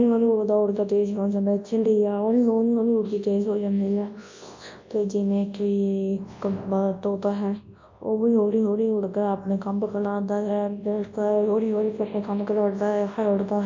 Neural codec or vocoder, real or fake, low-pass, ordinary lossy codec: codec, 24 kHz, 0.9 kbps, WavTokenizer, large speech release; fake; 7.2 kHz; MP3, 48 kbps